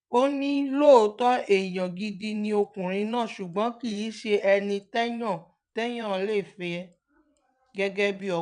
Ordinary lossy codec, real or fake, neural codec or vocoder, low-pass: none; fake; vocoder, 22.05 kHz, 80 mel bands, WaveNeXt; 9.9 kHz